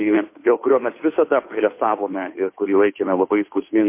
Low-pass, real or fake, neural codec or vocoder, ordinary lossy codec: 3.6 kHz; fake; codec, 16 kHz, 2 kbps, FunCodec, trained on Chinese and English, 25 frames a second; MP3, 24 kbps